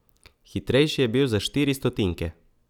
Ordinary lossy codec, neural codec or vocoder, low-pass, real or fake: none; none; 19.8 kHz; real